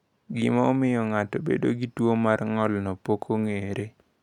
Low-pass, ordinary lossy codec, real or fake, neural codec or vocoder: 19.8 kHz; none; real; none